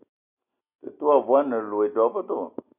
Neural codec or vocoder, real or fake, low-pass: none; real; 3.6 kHz